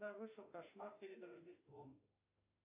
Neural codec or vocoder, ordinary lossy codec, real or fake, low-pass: autoencoder, 48 kHz, 32 numbers a frame, DAC-VAE, trained on Japanese speech; AAC, 32 kbps; fake; 3.6 kHz